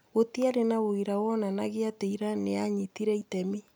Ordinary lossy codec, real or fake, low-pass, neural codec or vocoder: none; real; none; none